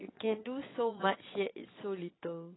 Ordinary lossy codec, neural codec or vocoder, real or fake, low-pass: AAC, 16 kbps; vocoder, 22.05 kHz, 80 mel bands, Vocos; fake; 7.2 kHz